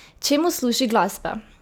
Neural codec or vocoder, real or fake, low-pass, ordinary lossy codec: none; real; none; none